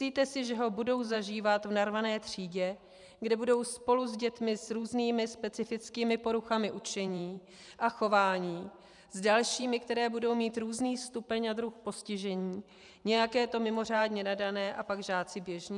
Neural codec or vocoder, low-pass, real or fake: vocoder, 44.1 kHz, 128 mel bands every 512 samples, BigVGAN v2; 10.8 kHz; fake